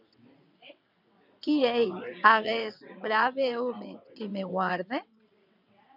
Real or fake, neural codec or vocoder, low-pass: fake; vocoder, 22.05 kHz, 80 mel bands, WaveNeXt; 5.4 kHz